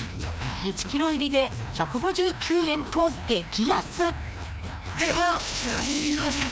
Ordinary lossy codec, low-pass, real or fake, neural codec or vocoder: none; none; fake; codec, 16 kHz, 1 kbps, FreqCodec, larger model